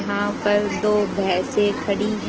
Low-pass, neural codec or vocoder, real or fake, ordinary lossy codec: 7.2 kHz; none; real; Opus, 16 kbps